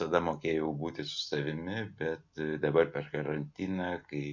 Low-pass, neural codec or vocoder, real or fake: 7.2 kHz; none; real